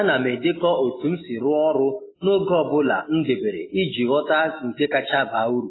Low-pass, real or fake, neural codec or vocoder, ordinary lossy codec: 7.2 kHz; real; none; AAC, 16 kbps